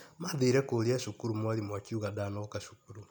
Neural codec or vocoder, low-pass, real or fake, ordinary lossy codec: none; 19.8 kHz; real; none